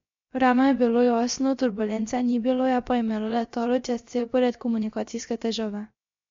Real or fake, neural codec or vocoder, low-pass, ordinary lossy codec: fake; codec, 16 kHz, about 1 kbps, DyCAST, with the encoder's durations; 7.2 kHz; MP3, 48 kbps